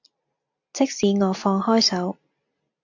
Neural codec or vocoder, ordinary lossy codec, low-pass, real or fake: none; AAC, 48 kbps; 7.2 kHz; real